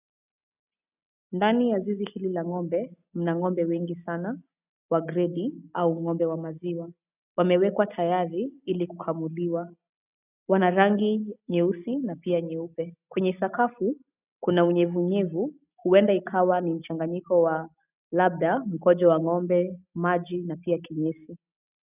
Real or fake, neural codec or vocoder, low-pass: real; none; 3.6 kHz